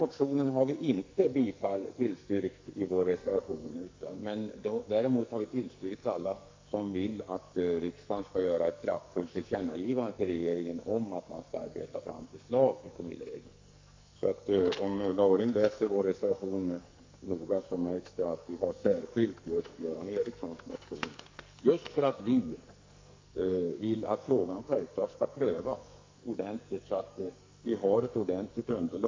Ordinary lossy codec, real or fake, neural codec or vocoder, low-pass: MP3, 48 kbps; fake; codec, 44.1 kHz, 2.6 kbps, SNAC; 7.2 kHz